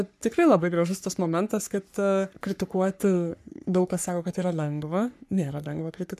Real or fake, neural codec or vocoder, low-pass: fake; codec, 44.1 kHz, 3.4 kbps, Pupu-Codec; 14.4 kHz